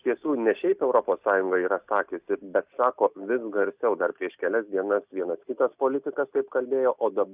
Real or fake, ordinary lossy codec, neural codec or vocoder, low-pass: real; Opus, 64 kbps; none; 3.6 kHz